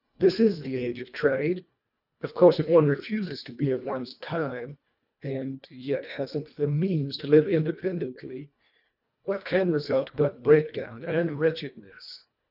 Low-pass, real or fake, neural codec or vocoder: 5.4 kHz; fake; codec, 24 kHz, 1.5 kbps, HILCodec